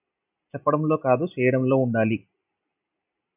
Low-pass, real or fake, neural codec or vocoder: 3.6 kHz; real; none